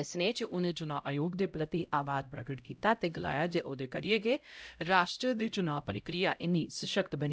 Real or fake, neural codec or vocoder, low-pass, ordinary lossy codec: fake; codec, 16 kHz, 0.5 kbps, X-Codec, HuBERT features, trained on LibriSpeech; none; none